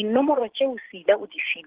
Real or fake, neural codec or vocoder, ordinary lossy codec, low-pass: real; none; Opus, 16 kbps; 3.6 kHz